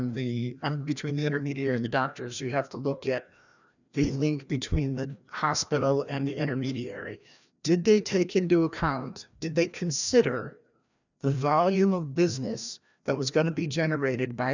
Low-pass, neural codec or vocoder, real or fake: 7.2 kHz; codec, 16 kHz, 1 kbps, FreqCodec, larger model; fake